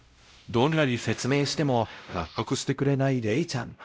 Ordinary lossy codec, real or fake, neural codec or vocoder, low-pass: none; fake; codec, 16 kHz, 0.5 kbps, X-Codec, WavLM features, trained on Multilingual LibriSpeech; none